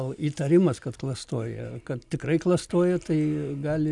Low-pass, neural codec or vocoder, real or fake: 10.8 kHz; none; real